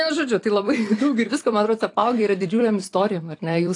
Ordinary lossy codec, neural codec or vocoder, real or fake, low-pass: AAC, 48 kbps; vocoder, 44.1 kHz, 128 mel bands every 256 samples, BigVGAN v2; fake; 10.8 kHz